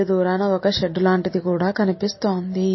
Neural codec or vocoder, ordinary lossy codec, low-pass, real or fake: none; MP3, 24 kbps; 7.2 kHz; real